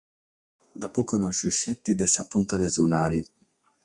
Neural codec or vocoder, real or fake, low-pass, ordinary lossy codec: codec, 32 kHz, 1.9 kbps, SNAC; fake; 10.8 kHz; Opus, 64 kbps